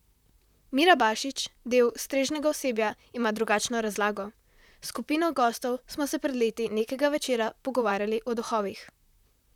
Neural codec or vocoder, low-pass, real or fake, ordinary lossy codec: vocoder, 44.1 kHz, 128 mel bands, Pupu-Vocoder; 19.8 kHz; fake; none